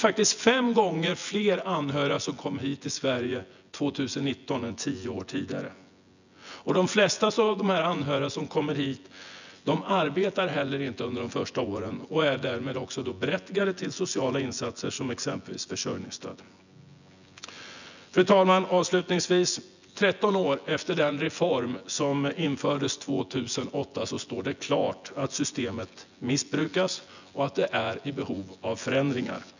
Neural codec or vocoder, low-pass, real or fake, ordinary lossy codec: vocoder, 24 kHz, 100 mel bands, Vocos; 7.2 kHz; fake; none